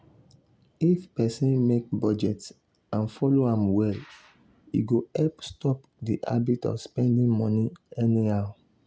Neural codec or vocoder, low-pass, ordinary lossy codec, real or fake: none; none; none; real